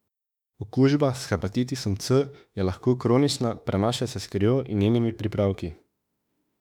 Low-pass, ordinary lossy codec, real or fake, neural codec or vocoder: 19.8 kHz; none; fake; autoencoder, 48 kHz, 32 numbers a frame, DAC-VAE, trained on Japanese speech